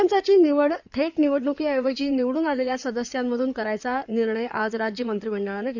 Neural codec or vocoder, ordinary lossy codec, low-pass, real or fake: codec, 16 kHz in and 24 kHz out, 2.2 kbps, FireRedTTS-2 codec; none; 7.2 kHz; fake